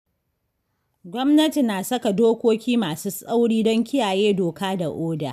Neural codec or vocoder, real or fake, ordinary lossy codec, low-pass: none; real; none; 14.4 kHz